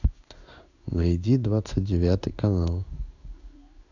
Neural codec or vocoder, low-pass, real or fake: codec, 16 kHz in and 24 kHz out, 1 kbps, XY-Tokenizer; 7.2 kHz; fake